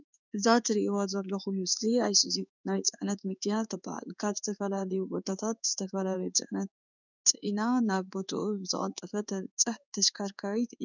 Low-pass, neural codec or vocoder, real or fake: 7.2 kHz; codec, 16 kHz in and 24 kHz out, 1 kbps, XY-Tokenizer; fake